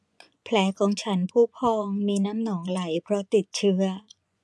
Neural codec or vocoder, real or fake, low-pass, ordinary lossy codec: vocoder, 24 kHz, 100 mel bands, Vocos; fake; none; none